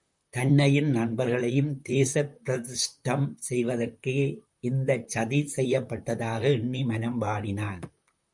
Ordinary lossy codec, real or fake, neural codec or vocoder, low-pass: MP3, 96 kbps; fake; vocoder, 44.1 kHz, 128 mel bands, Pupu-Vocoder; 10.8 kHz